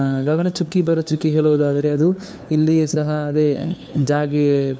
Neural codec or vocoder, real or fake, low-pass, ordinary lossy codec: codec, 16 kHz, 2 kbps, FunCodec, trained on LibriTTS, 25 frames a second; fake; none; none